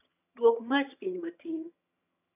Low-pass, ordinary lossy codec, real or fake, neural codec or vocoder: 3.6 kHz; none; fake; vocoder, 22.05 kHz, 80 mel bands, HiFi-GAN